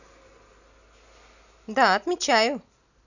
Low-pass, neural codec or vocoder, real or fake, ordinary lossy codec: 7.2 kHz; none; real; none